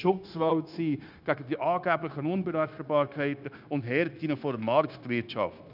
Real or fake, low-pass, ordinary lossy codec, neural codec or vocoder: fake; 5.4 kHz; none; codec, 16 kHz, 0.9 kbps, LongCat-Audio-Codec